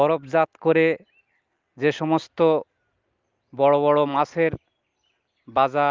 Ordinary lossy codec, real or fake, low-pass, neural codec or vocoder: Opus, 32 kbps; real; 7.2 kHz; none